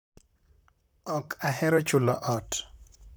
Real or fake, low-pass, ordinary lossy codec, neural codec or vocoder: fake; none; none; vocoder, 44.1 kHz, 128 mel bands, Pupu-Vocoder